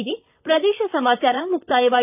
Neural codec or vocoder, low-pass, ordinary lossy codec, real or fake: vocoder, 44.1 kHz, 128 mel bands, Pupu-Vocoder; 3.6 kHz; AAC, 24 kbps; fake